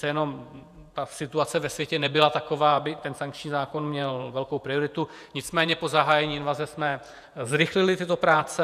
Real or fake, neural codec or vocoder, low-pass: fake; vocoder, 48 kHz, 128 mel bands, Vocos; 14.4 kHz